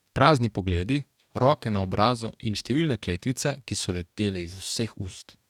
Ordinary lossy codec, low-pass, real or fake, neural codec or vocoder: none; 19.8 kHz; fake; codec, 44.1 kHz, 2.6 kbps, DAC